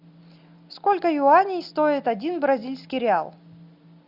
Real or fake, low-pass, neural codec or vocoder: real; 5.4 kHz; none